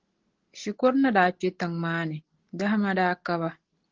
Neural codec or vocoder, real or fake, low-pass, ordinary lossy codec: none; real; 7.2 kHz; Opus, 16 kbps